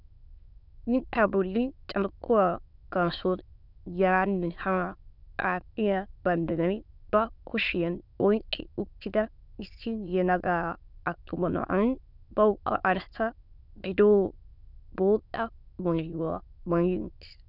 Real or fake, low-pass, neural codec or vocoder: fake; 5.4 kHz; autoencoder, 22.05 kHz, a latent of 192 numbers a frame, VITS, trained on many speakers